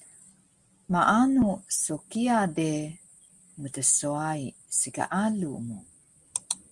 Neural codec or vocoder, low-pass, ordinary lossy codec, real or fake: none; 10.8 kHz; Opus, 24 kbps; real